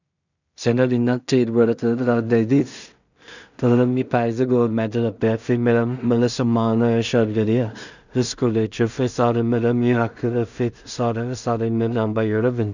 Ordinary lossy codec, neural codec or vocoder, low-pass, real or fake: none; codec, 16 kHz in and 24 kHz out, 0.4 kbps, LongCat-Audio-Codec, two codebook decoder; 7.2 kHz; fake